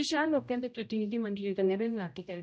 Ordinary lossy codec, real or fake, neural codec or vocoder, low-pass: none; fake; codec, 16 kHz, 0.5 kbps, X-Codec, HuBERT features, trained on general audio; none